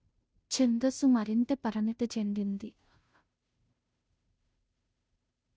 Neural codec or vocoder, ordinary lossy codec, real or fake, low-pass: codec, 16 kHz, 0.5 kbps, FunCodec, trained on Chinese and English, 25 frames a second; none; fake; none